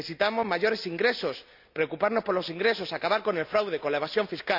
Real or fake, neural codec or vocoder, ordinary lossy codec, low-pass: real; none; none; 5.4 kHz